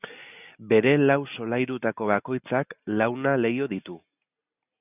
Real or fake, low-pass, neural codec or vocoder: real; 3.6 kHz; none